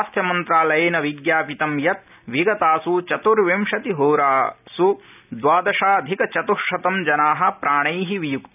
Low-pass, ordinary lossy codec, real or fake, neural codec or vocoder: 3.6 kHz; none; real; none